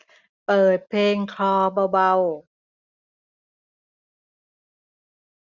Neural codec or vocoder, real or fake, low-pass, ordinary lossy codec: none; real; 7.2 kHz; none